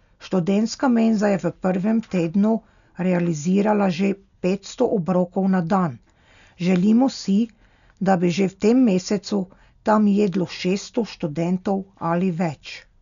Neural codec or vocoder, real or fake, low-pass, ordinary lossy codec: none; real; 7.2 kHz; none